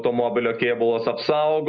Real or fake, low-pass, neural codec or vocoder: real; 7.2 kHz; none